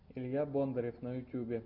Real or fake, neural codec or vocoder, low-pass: real; none; 5.4 kHz